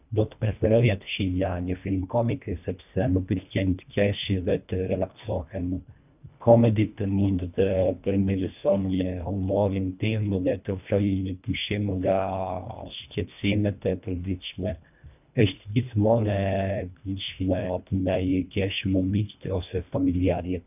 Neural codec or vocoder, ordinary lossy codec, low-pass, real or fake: codec, 24 kHz, 1.5 kbps, HILCodec; none; 3.6 kHz; fake